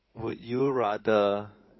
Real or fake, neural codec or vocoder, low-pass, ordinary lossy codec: fake; codec, 16 kHz in and 24 kHz out, 2.2 kbps, FireRedTTS-2 codec; 7.2 kHz; MP3, 24 kbps